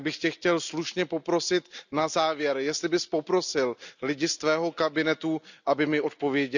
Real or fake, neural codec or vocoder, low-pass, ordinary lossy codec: real; none; 7.2 kHz; none